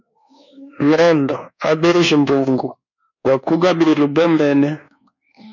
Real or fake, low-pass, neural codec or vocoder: fake; 7.2 kHz; codec, 24 kHz, 1.2 kbps, DualCodec